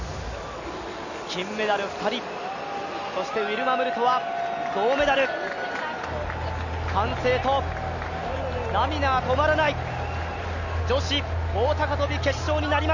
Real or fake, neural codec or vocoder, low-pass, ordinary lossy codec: real; none; 7.2 kHz; none